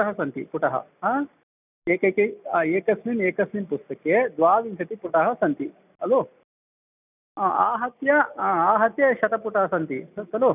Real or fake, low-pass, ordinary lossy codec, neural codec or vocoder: real; 3.6 kHz; none; none